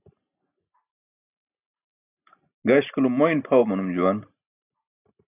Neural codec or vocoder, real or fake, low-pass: none; real; 3.6 kHz